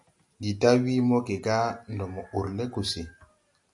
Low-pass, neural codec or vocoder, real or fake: 10.8 kHz; none; real